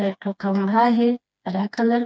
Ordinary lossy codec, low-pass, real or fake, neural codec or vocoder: none; none; fake; codec, 16 kHz, 2 kbps, FreqCodec, smaller model